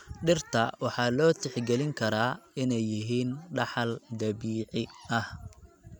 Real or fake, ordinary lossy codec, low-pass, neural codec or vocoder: real; none; 19.8 kHz; none